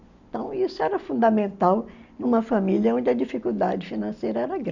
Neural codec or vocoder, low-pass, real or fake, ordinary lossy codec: none; 7.2 kHz; real; none